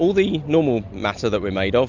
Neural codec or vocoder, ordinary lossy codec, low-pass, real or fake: none; Opus, 64 kbps; 7.2 kHz; real